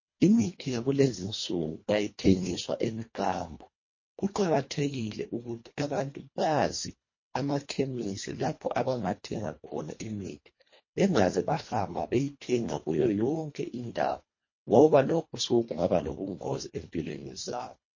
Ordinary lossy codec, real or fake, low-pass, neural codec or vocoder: MP3, 32 kbps; fake; 7.2 kHz; codec, 24 kHz, 1.5 kbps, HILCodec